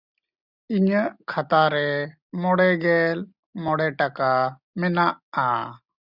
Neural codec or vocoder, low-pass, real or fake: none; 5.4 kHz; real